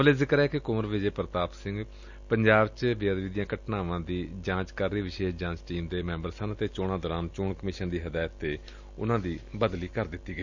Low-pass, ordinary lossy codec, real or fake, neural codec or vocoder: 7.2 kHz; none; real; none